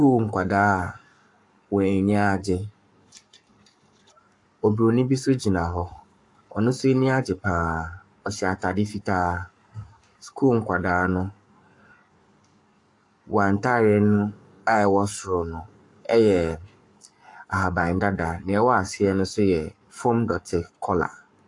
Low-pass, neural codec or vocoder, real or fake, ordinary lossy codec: 10.8 kHz; codec, 44.1 kHz, 7.8 kbps, Pupu-Codec; fake; AAC, 64 kbps